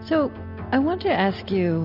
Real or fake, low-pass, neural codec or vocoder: real; 5.4 kHz; none